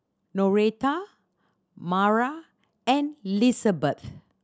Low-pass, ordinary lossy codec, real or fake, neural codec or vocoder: none; none; real; none